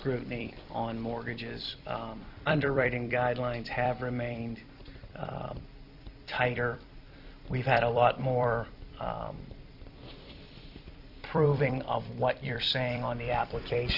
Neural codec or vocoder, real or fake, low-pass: vocoder, 44.1 kHz, 128 mel bands every 256 samples, BigVGAN v2; fake; 5.4 kHz